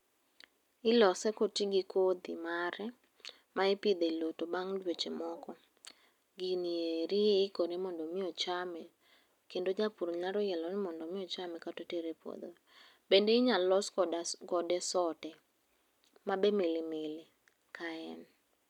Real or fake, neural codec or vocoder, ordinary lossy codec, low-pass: real; none; none; 19.8 kHz